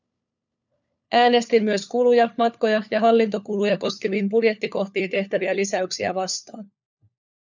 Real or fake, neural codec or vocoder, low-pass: fake; codec, 16 kHz, 4 kbps, FunCodec, trained on LibriTTS, 50 frames a second; 7.2 kHz